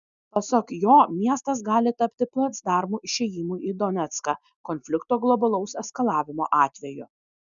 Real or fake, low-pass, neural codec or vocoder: real; 7.2 kHz; none